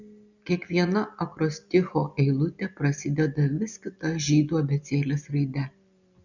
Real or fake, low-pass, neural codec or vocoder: real; 7.2 kHz; none